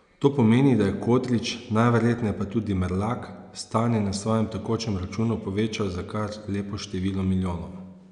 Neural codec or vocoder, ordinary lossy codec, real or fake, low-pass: none; none; real; 9.9 kHz